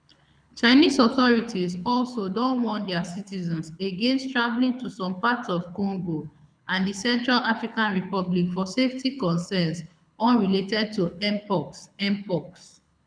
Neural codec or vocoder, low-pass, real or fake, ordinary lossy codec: codec, 24 kHz, 6 kbps, HILCodec; 9.9 kHz; fake; none